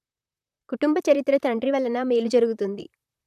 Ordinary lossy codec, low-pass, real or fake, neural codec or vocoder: none; 14.4 kHz; fake; vocoder, 44.1 kHz, 128 mel bands, Pupu-Vocoder